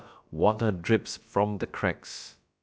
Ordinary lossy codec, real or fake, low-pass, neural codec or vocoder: none; fake; none; codec, 16 kHz, about 1 kbps, DyCAST, with the encoder's durations